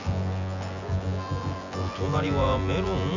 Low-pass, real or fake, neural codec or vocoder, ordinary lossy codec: 7.2 kHz; fake; vocoder, 24 kHz, 100 mel bands, Vocos; none